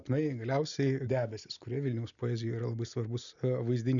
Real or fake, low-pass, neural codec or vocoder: real; 7.2 kHz; none